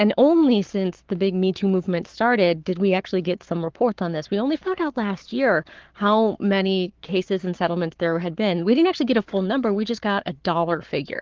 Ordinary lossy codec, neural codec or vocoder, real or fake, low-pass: Opus, 16 kbps; codec, 44.1 kHz, 7.8 kbps, Pupu-Codec; fake; 7.2 kHz